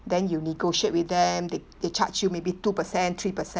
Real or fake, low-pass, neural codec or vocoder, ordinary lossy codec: real; none; none; none